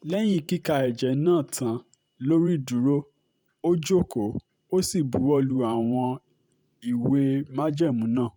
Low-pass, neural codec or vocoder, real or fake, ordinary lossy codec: 19.8 kHz; vocoder, 44.1 kHz, 128 mel bands every 512 samples, BigVGAN v2; fake; none